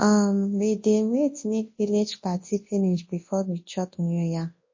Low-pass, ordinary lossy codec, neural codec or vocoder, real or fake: 7.2 kHz; MP3, 32 kbps; codec, 24 kHz, 0.9 kbps, WavTokenizer, large speech release; fake